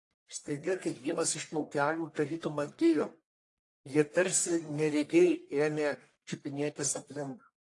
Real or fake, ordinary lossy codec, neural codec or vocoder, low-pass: fake; AAC, 48 kbps; codec, 44.1 kHz, 1.7 kbps, Pupu-Codec; 10.8 kHz